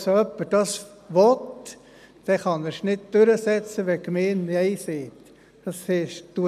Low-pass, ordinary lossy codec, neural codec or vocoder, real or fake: 14.4 kHz; none; none; real